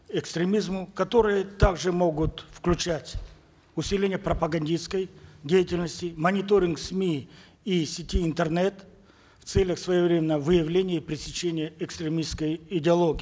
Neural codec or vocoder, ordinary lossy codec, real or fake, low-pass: none; none; real; none